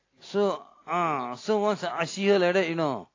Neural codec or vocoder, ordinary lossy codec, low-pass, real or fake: none; AAC, 32 kbps; 7.2 kHz; real